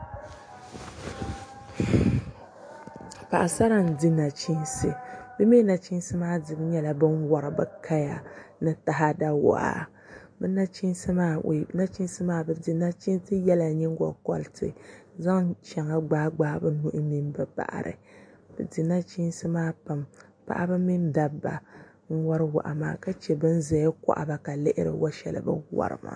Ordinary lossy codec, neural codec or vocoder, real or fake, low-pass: MP3, 48 kbps; none; real; 9.9 kHz